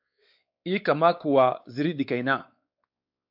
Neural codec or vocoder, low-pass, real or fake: codec, 16 kHz, 4 kbps, X-Codec, WavLM features, trained on Multilingual LibriSpeech; 5.4 kHz; fake